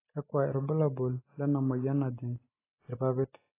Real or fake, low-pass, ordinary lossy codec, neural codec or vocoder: real; 3.6 kHz; AAC, 16 kbps; none